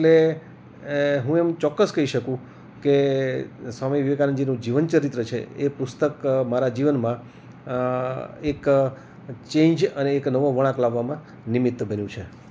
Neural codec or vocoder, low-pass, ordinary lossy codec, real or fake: none; none; none; real